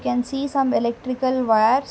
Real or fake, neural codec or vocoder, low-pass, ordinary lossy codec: real; none; none; none